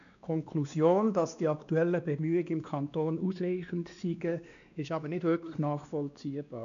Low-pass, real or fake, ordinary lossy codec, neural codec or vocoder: 7.2 kHz; fake; none; codec, 16 kHz, 2 kbps, X-Codec, WavLM features, trained on Multilingual LibriSpeech